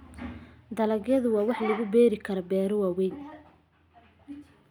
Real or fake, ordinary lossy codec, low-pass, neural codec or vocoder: real; none; 19.8 kHz; none